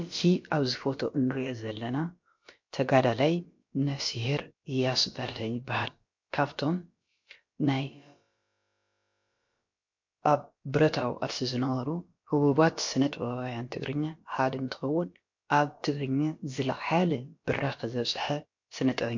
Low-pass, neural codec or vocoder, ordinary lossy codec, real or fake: 7.2 kHz; codec, 16 kHz, about 1 kbps, DyCAST, with the encoder's durations; MP3, 48 kbps; fake